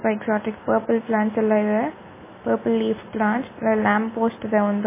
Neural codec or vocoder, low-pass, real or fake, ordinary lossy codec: none; 3.6 kHz; real; MP3, 16 kbps